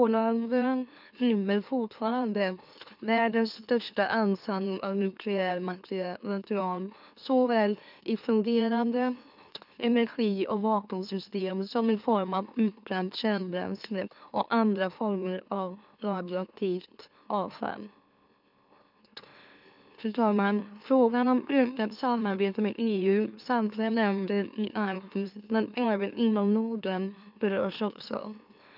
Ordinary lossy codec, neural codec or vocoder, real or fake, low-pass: none; autoencoder, 44.1 kHz, a latent of 192 numbers a frame, MeloTTS; fake; 5.4 kHz